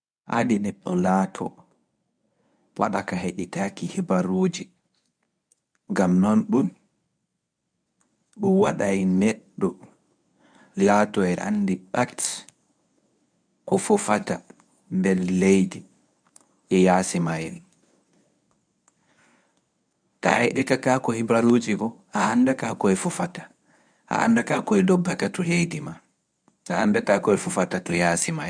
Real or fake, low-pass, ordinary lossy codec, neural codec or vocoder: fake; 9.9 kHz; none; codec, 24 kHz, 0.9 kbps, WavTokenizer, medium speech release version 1